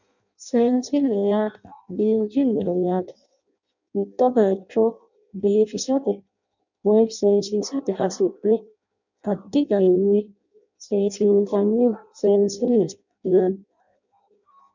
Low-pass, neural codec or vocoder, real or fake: 7.2 kHz; codec, 16 kHz in and 24 kHz out, 0.6 kbps, FireRedTTS-2 codec; fake